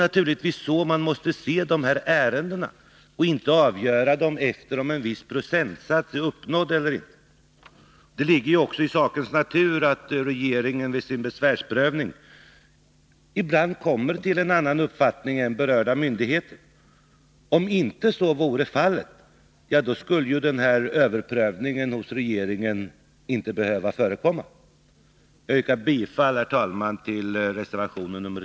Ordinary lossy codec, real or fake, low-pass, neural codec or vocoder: none; real; none; none